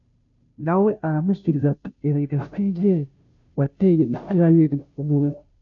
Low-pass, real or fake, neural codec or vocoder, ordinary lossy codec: 7.2 kHz; fake; codec, 16 kHz, 0.5 kbps, FunCodec, trained on Chinese and English, 25 frames a second; MP3, 64 kbps